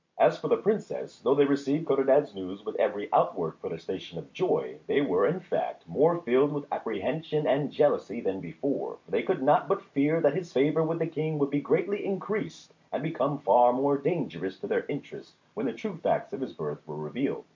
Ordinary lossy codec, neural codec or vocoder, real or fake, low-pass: MP3, 48 kbps; none; real; 7.2 kHz